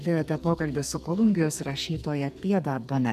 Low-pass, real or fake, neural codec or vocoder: 14.4 kHz; fake; codec, 44.1 kHz, 2.6 kbps, SNAC